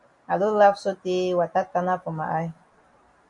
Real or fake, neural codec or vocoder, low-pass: real; none; 10.8 kHz